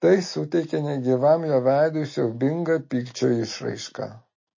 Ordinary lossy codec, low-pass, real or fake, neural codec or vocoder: MP3, 32 kbps; 7.2 kHz; real; none